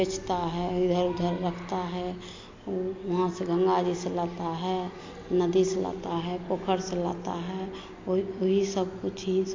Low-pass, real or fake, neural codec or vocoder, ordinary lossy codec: 7.2 kHz; real; none; MP3, 48 kbps